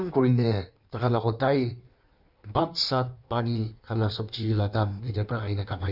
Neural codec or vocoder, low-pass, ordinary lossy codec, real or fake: codec, 16 kHz in and 24 kHz out, 1.1 kbps, FireRedTTS-2 codec; 5.4 kHz; none; fake